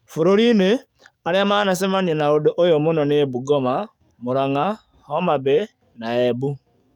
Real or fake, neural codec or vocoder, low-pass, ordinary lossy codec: fake; codec, 44.1 kHz, 7.8 kbps, DAC; 19.8 kHz; none